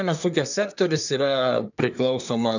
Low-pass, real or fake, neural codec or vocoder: 7.2 kHz; fake; codec, 24 kHz, 1 kbps, SNAC